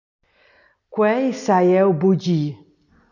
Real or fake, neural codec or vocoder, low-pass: real; none; 7.2 kHz